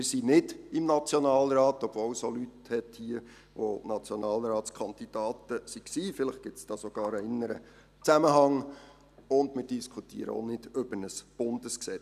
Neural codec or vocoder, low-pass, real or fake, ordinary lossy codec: none; 14.4 kHz; real; none